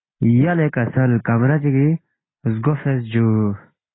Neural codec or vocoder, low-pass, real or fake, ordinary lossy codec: none; 7.2 kHz; real; AAC, 16 kbps